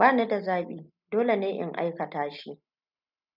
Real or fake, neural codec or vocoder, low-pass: real; none; 5.4 kHz